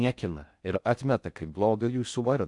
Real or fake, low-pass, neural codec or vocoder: fake; 10.8 kHz; codec, 16 kHz in and 24 kHz out, 0.6 kbps, FocalCodec, streaming, 4096 codes